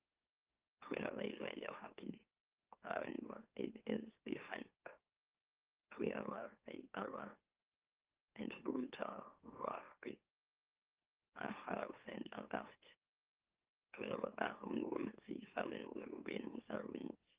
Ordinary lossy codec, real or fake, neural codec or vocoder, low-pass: Opus, 32 kbps; fake; autoencoder, 44.1 kHz, a latent of 192 numbers a frame, MeloTTS; 3.6 kHz